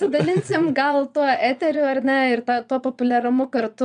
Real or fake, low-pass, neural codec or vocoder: fake; 9.9 kHz; vocoder, 22.05 kHz, 80 mel bands, Vocos